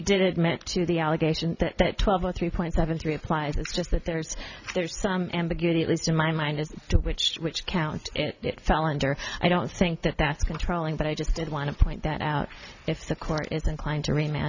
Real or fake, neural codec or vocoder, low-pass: real; none; 7.2 kHz